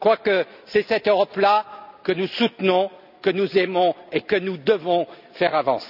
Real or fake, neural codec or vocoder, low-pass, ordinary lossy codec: real; none; 5.4 kHz; none